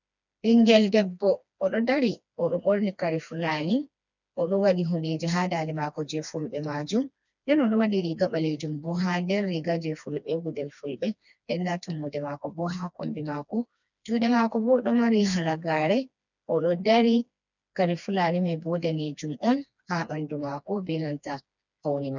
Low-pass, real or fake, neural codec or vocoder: 7.2 kHz; fake; codec, 16 kHz, 2 kbps, FreqCodec, smaller model